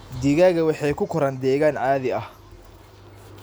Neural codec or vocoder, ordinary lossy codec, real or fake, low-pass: none; none; real; none